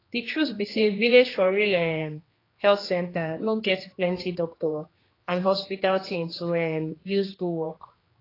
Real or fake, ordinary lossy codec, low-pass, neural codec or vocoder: fake; AAC, 24 kbps; 5.4 kHz; codec, 16 kHz, 2 kbps, X-Codec, HuBERT features, trained on general audio